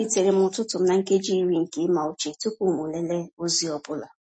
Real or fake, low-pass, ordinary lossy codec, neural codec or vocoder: real; 9.9 kHz; MP3, 32 kbps; none